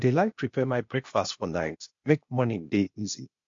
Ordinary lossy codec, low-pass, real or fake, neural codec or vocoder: MP3, 48 kbps; 7.2 kHz; fake; codec, 16 kHz, 0.8 kbps, ZipCodec